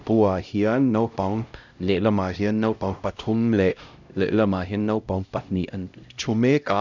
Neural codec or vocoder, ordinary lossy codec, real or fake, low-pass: codec, 16 kHz, 0.5 kbps, X-Codec, HuBERT features, trained on LibriSpeech; none; fake; 7.2 kHz